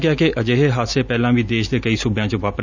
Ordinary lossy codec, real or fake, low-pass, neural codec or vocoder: none; real; 7.2 kHz; none